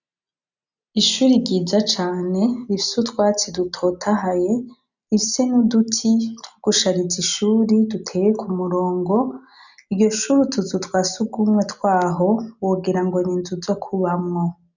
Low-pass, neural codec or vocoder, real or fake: 7.2 kHz; none; real